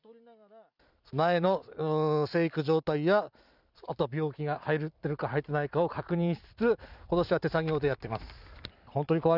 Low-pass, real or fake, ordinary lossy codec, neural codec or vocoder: 5.4 kHz; fake; none; vocoder, 44.1 kHz, 128 mel bands every 256 samples, BigVGAN v2